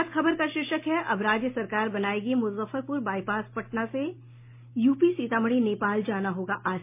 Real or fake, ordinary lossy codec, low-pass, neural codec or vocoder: real; MP3, 24 kbps; 3.6 kHz; none